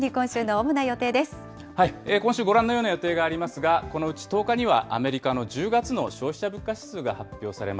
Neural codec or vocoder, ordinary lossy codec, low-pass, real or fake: none; none; none; real